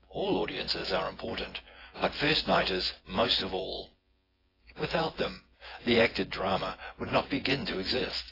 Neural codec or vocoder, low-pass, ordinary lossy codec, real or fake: vocoder, 24 kHz, 100 mel bands, Vocos; 5.4 kHz; AAC, 24 kbps; fake